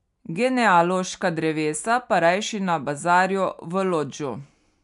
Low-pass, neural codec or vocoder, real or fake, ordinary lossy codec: 10.8 kHz; none; real; none